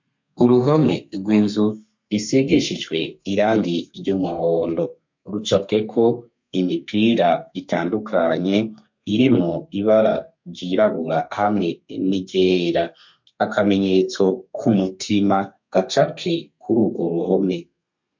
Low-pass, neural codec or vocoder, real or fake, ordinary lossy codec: 7.2 kHz; codec, 32 kHz, 1.9 kbps, SNAC; fake; MP3, 48 kbps